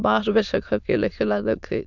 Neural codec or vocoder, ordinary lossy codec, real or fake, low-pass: autoencoder, 22.05 kHz, a latent of 192 numbers a frame, VITS, trained on many speakers; none; fake; 7.2 kHz